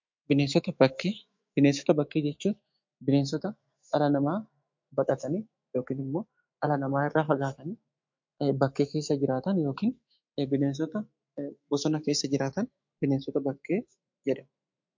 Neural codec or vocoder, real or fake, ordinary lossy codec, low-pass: autoencoder, 48 kHz, 128 numbers a frame, DAC-VAE, trained on Japanese speech; fake; MP3, 48 kbps; 7.2 kHz